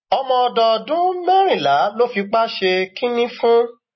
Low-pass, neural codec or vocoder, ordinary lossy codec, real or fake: 7.2 kHz; none; MP3, 24 kbps; real